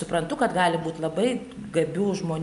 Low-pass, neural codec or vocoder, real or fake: 10.8 kHz; none; real